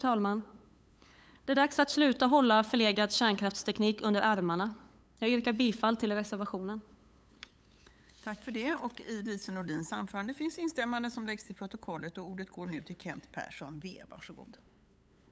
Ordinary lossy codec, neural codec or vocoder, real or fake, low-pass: none; codec, 16 kHz, 8 kbps, FunCodec, trained on LibriTTS, 25 frames a second; fake; none